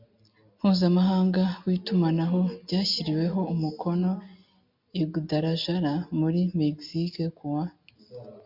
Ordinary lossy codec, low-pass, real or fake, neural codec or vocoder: MP3, 48 kbps; 5.4 kHz; real; none